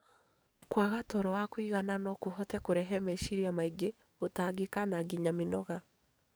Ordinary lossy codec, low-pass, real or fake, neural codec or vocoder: none; none; fake; codec, 44.1 kHz, 7.8 kbps, DAC